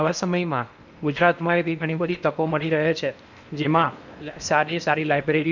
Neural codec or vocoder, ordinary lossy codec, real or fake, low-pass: codec, 16 kHz in and 24 kHz out, 0.8 kbps, FocalCodec, streaming, 65536 codes; none; fake; 7.2 kHz